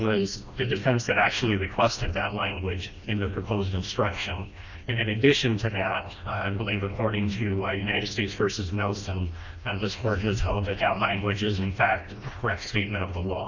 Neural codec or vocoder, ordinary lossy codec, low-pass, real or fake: codec, 16 kHz, 1 kbps, FreqCodec, smaller model; Opus, 64 kbps; 7.2 kHz; fake